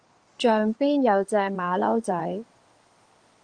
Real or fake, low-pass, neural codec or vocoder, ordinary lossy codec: fake; 9.9 kHz; codec, 16 kHz in and 24 kHz out, 2.2 kbps, FireRedTTS-2 codec; Opus, 24 kbps